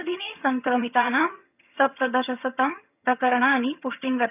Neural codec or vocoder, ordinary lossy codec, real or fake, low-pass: vocoder, 22.05 kHz, 80 mel bands, HiFi-GAN; none; fake; 3.6 kHz